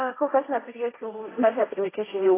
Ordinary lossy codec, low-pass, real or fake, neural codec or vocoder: AAC, 16 kbps; 3.6 kHz; fake; codec, 16 kHz, 1.1 kbps, Voila-Tokenizer